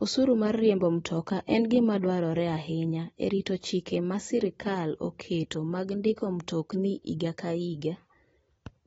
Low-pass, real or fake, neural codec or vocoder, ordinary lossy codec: 19.8 kHz; real; none; AAC, 24 kbps